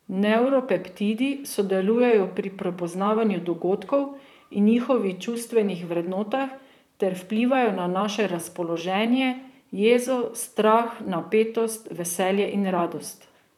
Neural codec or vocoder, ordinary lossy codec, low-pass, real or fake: vocoder, 44.1 kHz, 128 mel bands, Pupu-Vocoder; none; 19.8 kHz; fake